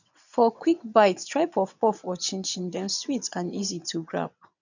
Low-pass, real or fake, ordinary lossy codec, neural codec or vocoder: 7.2 kHz; fake; none; vocoder, 22.05 kHz, 80 mel bands, WaveNeXt